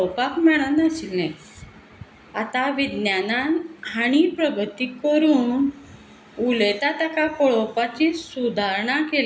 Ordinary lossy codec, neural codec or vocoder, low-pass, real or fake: none; none; none; real